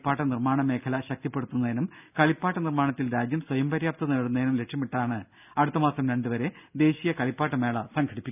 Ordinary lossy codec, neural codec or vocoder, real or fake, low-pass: none; none; real; 3.6 kHz